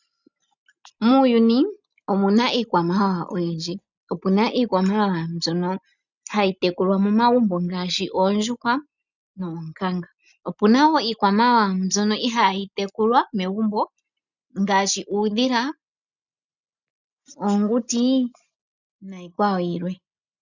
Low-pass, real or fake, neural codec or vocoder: 7.2 kHz; real; none